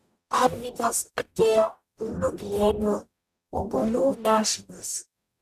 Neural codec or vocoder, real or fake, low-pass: codec, 44.1 kHz, 0.9 kbps, DAC; fake; 14.4 kHz